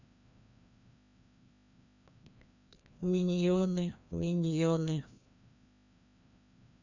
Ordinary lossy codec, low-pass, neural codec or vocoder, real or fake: none; 7.2 kHz; codec, 16 kHz, 1 kbps, FreqCodec, larger model; fake